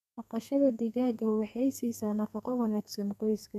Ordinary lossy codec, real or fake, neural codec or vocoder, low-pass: none; fake; codec, 32 kHz, 1.9 kbps, SNAC; 14.4 kHz